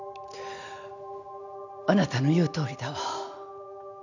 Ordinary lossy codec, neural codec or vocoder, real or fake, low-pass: none; none; real; 7.2 kHz